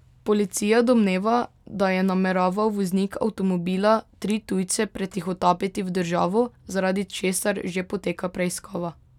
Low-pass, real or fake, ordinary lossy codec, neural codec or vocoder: 19.8 kHz; real; none; none